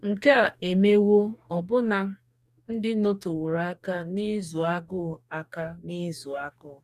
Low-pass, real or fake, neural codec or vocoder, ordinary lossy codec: 14.4 kHz; fake; codec, 44.1 kHz, 2.6 kbps, DAC; none